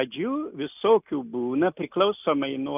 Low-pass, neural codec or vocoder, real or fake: 3.6 kHz; none; real